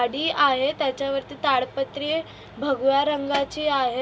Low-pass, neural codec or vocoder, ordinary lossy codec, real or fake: none; none; none; real